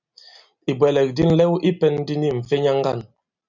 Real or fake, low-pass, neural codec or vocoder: real; 7.2 kHz; none